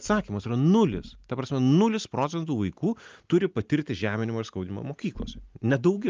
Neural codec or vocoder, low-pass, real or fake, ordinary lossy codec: none; 7.2 kHz; real; Opus, 24 kbps